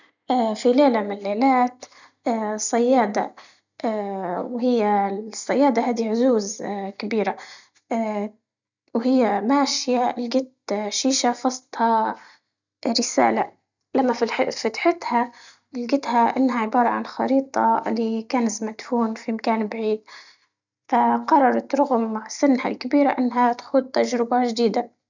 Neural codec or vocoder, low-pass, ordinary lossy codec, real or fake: none; 7.2 kHz; none; real